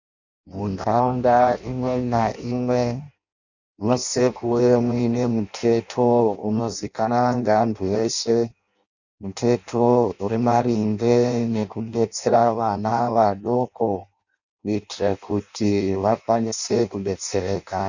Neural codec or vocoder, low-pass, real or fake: codec, 16 kHz in and 24 kHz out, 0.6 kbps, FireRedTTS-2 codec; 7.2 kHz; fake